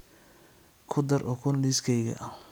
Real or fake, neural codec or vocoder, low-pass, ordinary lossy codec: real; none; none; none